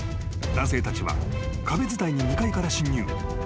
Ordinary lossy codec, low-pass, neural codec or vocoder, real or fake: none; none; none; real